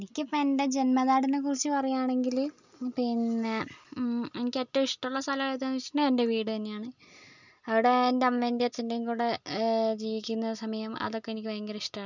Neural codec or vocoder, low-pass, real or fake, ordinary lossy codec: none; 7.2 kHz; real; none